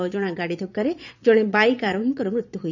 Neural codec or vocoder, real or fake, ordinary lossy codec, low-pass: vocoder, 22.05 kHz, 80 mel bands, Vocos; fake; none; 7.2 kHz